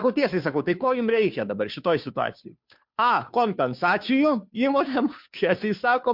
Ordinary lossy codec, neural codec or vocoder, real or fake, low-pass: MP3, 48 kbps; codec, 16 kHz, 2 kbps, FunCodec, trained on Chinese and English, 25 frames a second; fake; 5.4 kHz